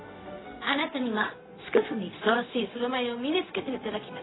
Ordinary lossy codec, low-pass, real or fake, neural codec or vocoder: AAC, 16 kbps; 7.2 kHz; fake; codec, 16 kHz, 0.4 kbps, LongCat-Audio-Codec